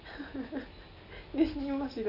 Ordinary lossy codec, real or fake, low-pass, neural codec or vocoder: none; real; 5.4 kHz; none